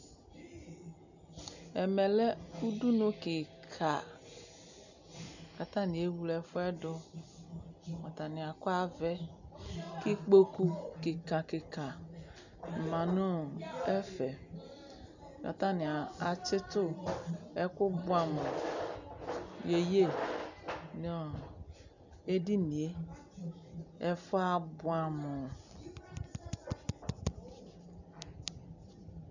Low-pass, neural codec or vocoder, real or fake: 7.2 kHz; none; real